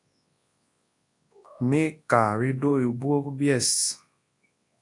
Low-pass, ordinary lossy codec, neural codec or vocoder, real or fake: 10.8 kHz; AAC, 48 kbps; codec, 24 kHz, 0.9 kbps, WavTokenizer, large speech release; fake